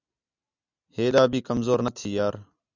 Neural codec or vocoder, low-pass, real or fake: none; 7.2 kHz; real